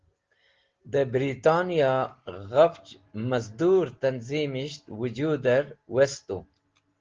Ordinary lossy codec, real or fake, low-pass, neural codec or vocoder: Opus, 16 kbps; real; 7.2 kHz; none